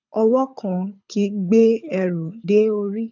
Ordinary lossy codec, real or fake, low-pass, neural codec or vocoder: none; fake; 7.2 kHz; codec, 24 kHz, 6 kbps, HILCodec